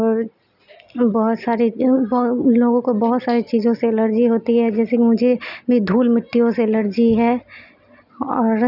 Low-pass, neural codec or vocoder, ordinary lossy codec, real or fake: 5.4 kHz; none; none; real